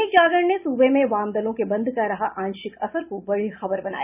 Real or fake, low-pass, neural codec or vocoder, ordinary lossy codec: real; 3.6 kHz; none; none